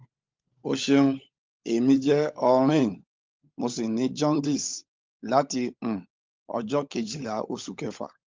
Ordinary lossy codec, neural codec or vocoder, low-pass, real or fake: Opus, 24 kbps; codec, 16 kHz, 16 kbps, FunCodec, trained on LibriTTS, 50 frames a second; 7.2 kHz; fake